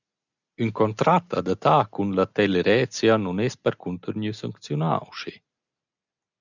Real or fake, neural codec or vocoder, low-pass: real; none; 7.2 kHz